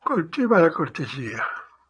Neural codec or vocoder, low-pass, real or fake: vocoder, 22.05 kHz, 80 mel bands, Vocos; 9.9 kHz; fake